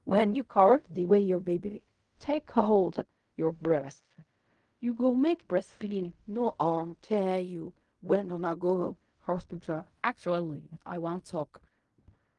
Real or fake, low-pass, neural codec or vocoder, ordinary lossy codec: fake; 10.8 kHz; codec, 16 kHz in and 24 kHz out, 0.4 kbps, LongCat-Audio-Codec, fine tuned four codebook decoder; Opus, 32 kbps